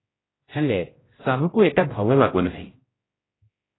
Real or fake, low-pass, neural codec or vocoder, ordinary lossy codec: fake; 7.2 kHz; codec, 16 kHz, 0.5 kbps, X-Codec, HuBERT features, trained on general audio; AAC, 16 kbps